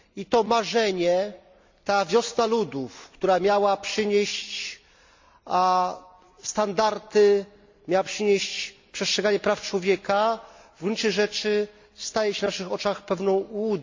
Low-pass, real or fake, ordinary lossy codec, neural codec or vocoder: 7.2 kHz; real; MP3, 64 kbps; none